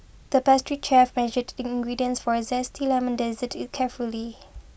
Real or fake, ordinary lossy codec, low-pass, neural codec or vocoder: real; none; none; none